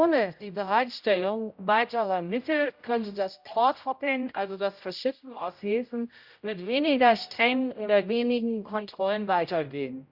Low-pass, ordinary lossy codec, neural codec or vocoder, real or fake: 5.4 kHz; Opus, 64 kbps; codec, 16 kHz, 0.5 kbps, X-Codec, HuBERT features, trained on general audio; fake